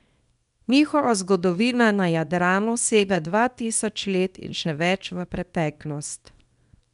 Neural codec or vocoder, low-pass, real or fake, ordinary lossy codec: codec, 24 kHz, 0.9 kbps, WavTokenizer, small release; 10.8 kHz; fake; none